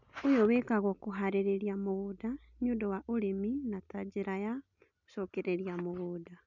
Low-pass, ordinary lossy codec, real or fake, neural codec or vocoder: 7.2 kHz; none; real; none